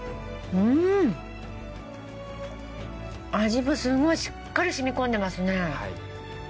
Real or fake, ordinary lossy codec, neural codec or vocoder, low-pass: real; none; none; none